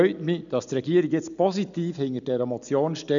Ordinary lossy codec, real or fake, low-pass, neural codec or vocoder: none; real; 7.2 kHz; none